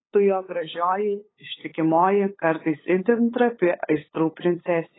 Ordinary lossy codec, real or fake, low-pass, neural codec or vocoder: AAC, 16 kbps; fake; 7.2 kHz; codec, 16 kHz, 8 kbps, FunCodec, trained on LibriTTS, 25 frames a second